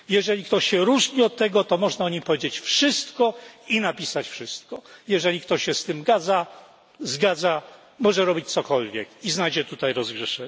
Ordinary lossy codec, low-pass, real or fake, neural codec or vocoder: none; none; real; none